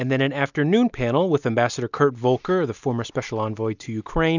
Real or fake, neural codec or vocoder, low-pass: real; none; 7.2 kHz